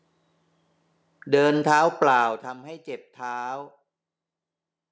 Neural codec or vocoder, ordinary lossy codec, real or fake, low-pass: none; none; real; none